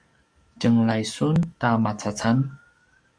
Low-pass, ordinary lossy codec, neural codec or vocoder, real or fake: 9.9 kHz; Opus, 64 kbps; codec, 44.1 kHz, 7.8 kbps, DAC; fake